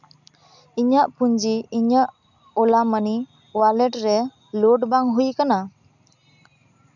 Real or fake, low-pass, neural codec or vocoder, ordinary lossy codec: real; 7.2 kHz; none; none